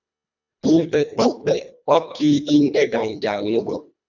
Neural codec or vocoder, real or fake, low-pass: codec, 24 kHz, 1.5 kbps, HILCodec; fake; 7.2 kHz